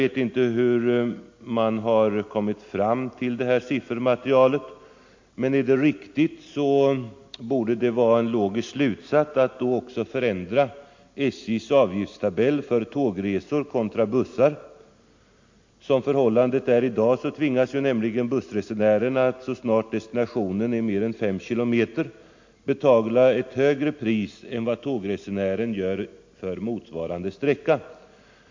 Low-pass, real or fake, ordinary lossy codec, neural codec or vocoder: 7.2 kHz; real; MP3, 48 kbps; none